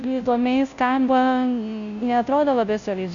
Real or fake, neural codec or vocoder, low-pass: fake; codec, 16 kHz, 0.5 kbps, FunCodec, trained on Chinese and English, 25 frames a second; 7.2 kHz